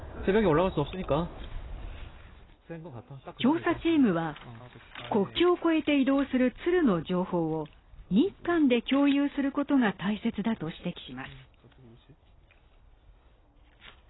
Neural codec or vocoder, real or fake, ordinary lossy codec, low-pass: none; real; AAC, 16 kbps; 7.2 kHz